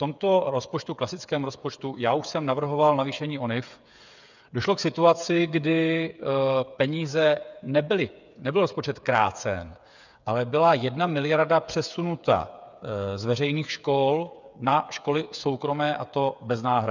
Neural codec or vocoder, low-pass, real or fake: codec, 16 kHz, 8 kbps, FreqCodec, smaller model; 7.2 kHz; fake